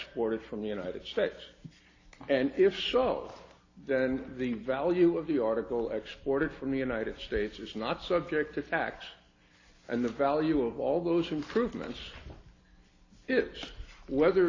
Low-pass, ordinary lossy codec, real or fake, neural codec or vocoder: 7.2 kHz; AAC, 32 kbps; real; none